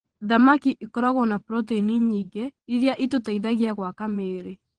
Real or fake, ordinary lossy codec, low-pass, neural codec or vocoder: fake; Opus, 16 kbps; 9.9 kHz; vocoder, 22.05 kHz, 80 mel bands, WaveNeXt